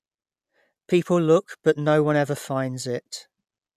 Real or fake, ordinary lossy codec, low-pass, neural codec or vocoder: real; none; 14.4 kHz; none